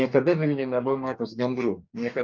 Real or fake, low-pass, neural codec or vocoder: fake; 7.2 kHz; codec, 44.1 kHz, 2.6 kbps, DAC